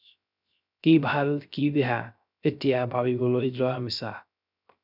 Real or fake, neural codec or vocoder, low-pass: fake; codec, 16 kHz, 0.3 kbps, FocalCodec; 5.4 kHz